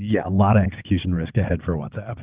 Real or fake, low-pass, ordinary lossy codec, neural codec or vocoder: fake; 3.6 kHz; Opus, 32 kbps; vocoder, 22.05 kHz, 80 mel bands, WaveNeXt